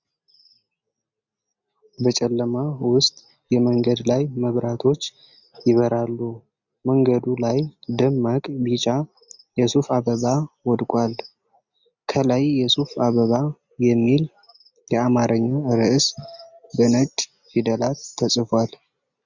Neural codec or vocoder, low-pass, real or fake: none; 7.2 kHz; real